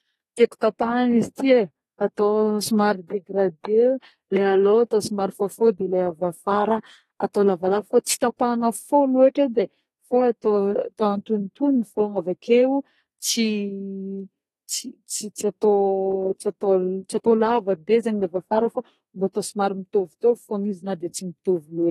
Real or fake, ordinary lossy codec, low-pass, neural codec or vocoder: fake; AAC, 32 kbps; 14.4 kHz; codec, 32 kHz, 1.9 kbps, SNAC